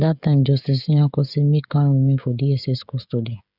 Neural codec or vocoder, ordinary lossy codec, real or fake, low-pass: codec, 16 kHz, 16 kbps, FreqCodec, smaller model; none; fake; 5.4 kHz